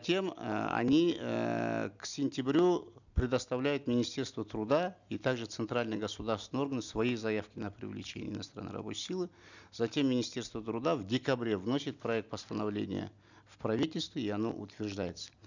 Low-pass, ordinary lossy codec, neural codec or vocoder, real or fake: 7.2 kHz; none; none; real